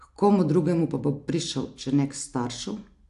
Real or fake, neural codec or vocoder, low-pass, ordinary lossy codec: real; none; 10.8 kHz; AAC, 64 kbps